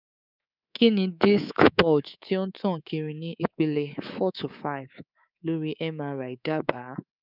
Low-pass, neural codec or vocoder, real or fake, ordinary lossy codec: 5.4 kHz; codec, 24 kHz, 3.1 kbps, DualCodec; fake; none